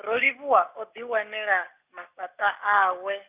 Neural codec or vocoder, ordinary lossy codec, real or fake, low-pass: none; Opus, 64 kbps; real; 3.6 kHz